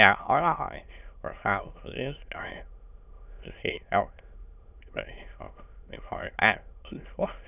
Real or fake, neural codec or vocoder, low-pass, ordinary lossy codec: fake; autoencoder, 22.05 kHz, a latent of 192 numbers a frame, VITS, trained on many speakers; 3.6 kHz; none